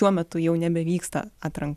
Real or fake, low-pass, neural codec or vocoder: real; 14.4 kHz; none